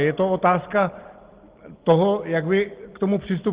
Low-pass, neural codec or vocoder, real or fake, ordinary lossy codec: 3.6 kHz; none; real; Opus, 16 kbps